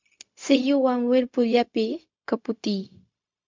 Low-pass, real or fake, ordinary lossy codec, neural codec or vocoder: 7.2 kHz; fake; MP3, 64 kbps; codec, 16 kHz, 0.4 kbps, LongCat-Audio-Codec